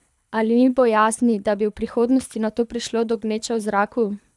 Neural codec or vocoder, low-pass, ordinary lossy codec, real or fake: codec, 24 kHz, 6 kbps, HILCodec; none; none; fake